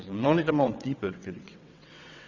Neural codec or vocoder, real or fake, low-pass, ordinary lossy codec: vocoder, 22.05 kHz, 80 mel bands, WaveNeXt; fake; 7.2 kHz; none